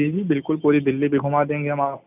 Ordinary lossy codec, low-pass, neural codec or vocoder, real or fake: none; 3.6 kHz; none; real